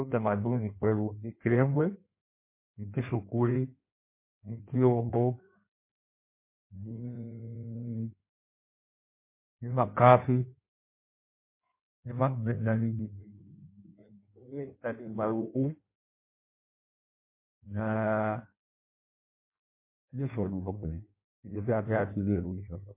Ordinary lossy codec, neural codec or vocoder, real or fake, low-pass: MP3, 24 kbps; codec, 16 kHz in and 24 kHz out, 1.1 kbps, FireRedTTS-2 codec; fake; 3.6 kHz